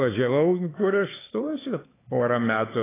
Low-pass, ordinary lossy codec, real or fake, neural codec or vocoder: 3.6 kHz; AAC, 16 kbps; fake; codec, 16 kHz, 4 kbps, X-Codec, HuBERT features, trained on LibriSpeech